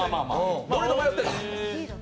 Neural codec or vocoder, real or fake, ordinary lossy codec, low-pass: none; real; none; none